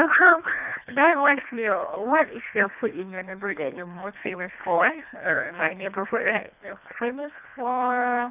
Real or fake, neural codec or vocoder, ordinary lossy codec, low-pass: fake; codec, 24 kHz, 1.5 kbps, HILCodec; none; 3.6 kHz